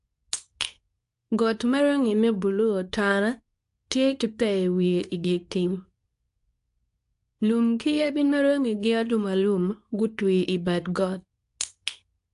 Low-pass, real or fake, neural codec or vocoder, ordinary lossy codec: 10.8 kHz; fake; codec, 24 kHz, 0.9 kbps, WavTokenizer, medium speech release version 2; AAC, 64 kbps